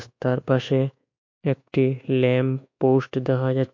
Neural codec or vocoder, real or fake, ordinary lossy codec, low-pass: codec, 24 kHz, 1.2 kbps, DualCodec; fake; MP3, 48 kbps; 7.2 kHz